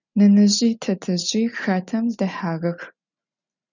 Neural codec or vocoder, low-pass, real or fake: none; 7.2 kHz; real